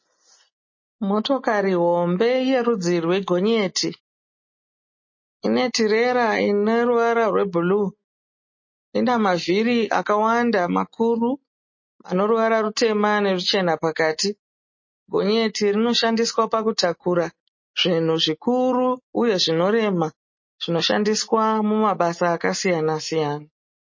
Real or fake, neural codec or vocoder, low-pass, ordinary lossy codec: real; none; 7.2 kHz; MP3, 32 kbps